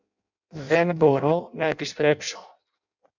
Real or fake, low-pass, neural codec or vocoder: fake; 7.2 kHz; codec, 16 kHz in and 24 kHz out, 0.6 kbps, FireRedTTS-2 codec